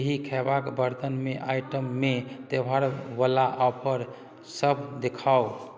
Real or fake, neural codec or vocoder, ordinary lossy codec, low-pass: real; none; none; none